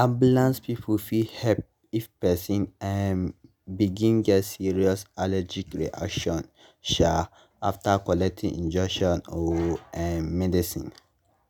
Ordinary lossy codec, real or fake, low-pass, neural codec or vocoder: none; real; none; none